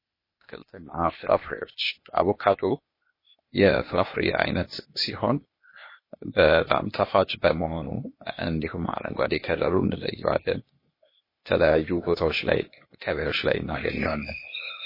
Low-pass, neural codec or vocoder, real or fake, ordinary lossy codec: 5.4 kHz; codec, 16 kHz, 0.8 kbps, ZipCodec; fake; MP3, 24 kbps